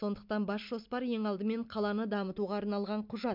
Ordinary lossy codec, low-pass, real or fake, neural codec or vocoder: MP3, 48 kbps; 5.4 kHz; real; none